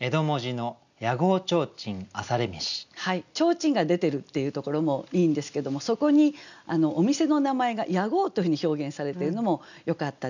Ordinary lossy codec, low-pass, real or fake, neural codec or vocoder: none; 7.2 kHz; real; none